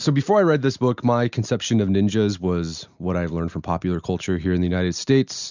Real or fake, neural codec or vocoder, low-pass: real; none; 7.2 kHz